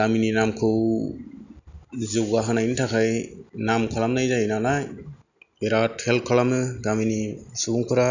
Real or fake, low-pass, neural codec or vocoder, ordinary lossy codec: real; 7.2 kHz; none; none